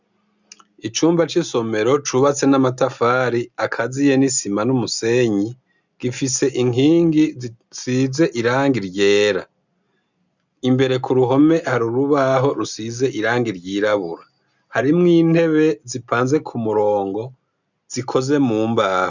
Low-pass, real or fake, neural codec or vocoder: 7.2 kHz; real; none